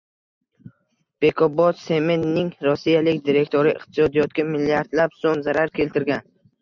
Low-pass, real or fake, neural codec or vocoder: 7.2 kHz; real; none